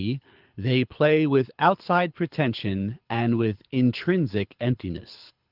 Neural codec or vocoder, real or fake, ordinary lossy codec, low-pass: codec, 24 kHz, 6 kbps, HILCodec; fake; Opus, 32 kbps; 5.4 kHz